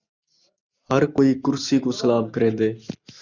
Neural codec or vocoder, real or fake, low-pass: none; real; 7.2 kHz